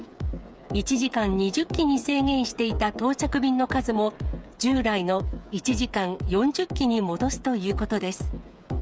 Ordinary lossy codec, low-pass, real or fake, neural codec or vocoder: none; none; fake; codec, 16 kHz, 8 kbps, FreqCodec, smaller model